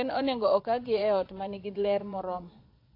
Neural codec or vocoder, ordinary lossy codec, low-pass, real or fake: vocoder, 22.05 kHz, 80 mel bands, Vocos; AAC, 32 kbps; 5.4 kHz; fake